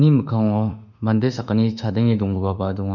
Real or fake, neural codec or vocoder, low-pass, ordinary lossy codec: fake; autoencoder, 48 kHz, 32 numbers a frame, DAC-VAE, trained on Japanese speech; 7.2 kHz; none